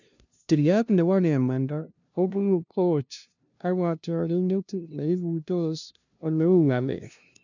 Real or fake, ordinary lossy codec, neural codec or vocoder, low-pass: fake; none; codec, 16 kHz, 0.5 kbps, FunCodec, trained on LibriTTS, 25 frames a second; 7.2 kHz